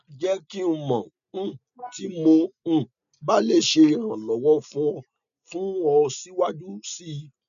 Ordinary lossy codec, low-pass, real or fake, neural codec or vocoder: none; 7.2 kHz; real; none